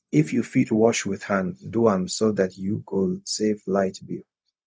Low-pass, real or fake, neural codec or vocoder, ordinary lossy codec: none; fake; codec, 16 kHz, 0.4 kbps, LongCat-Audio-Codec; none